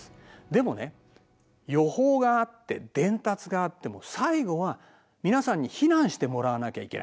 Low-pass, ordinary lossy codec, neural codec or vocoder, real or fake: none; none; none; real